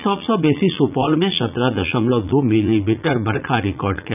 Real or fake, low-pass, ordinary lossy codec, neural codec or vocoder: fake; 3.6 kHz; none; vocoder, 44.1 kHz, 80 mel bands, Vocos